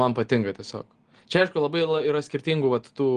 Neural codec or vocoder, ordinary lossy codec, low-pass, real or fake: none; Opus, 24 kbps; 9.9 kHz; real